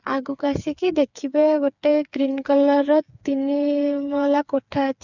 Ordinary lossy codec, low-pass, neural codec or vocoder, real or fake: none; 7.2 kHz; codec, 16 kHz, 4 kbps, FreqCodec, smaller model; fake